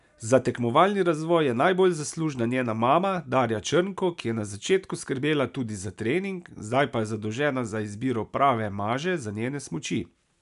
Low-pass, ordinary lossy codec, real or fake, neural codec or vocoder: 10.8 kHz; none; real; none